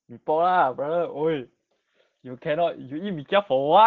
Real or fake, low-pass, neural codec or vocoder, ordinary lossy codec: real; 7.2 kHz; none; Opus, 16 kbps